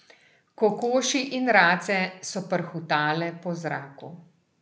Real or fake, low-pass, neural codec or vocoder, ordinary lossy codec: real; none; none; none